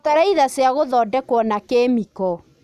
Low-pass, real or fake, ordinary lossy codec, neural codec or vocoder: 14.4 kHz; real; none; none